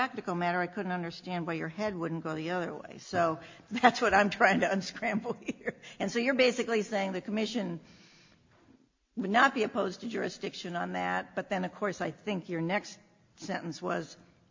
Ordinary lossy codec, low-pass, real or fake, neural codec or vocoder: MP3, 48 kbps; 7.2 kHz; real; none